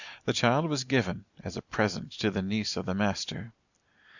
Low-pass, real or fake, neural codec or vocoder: 7.2 kHz; real; none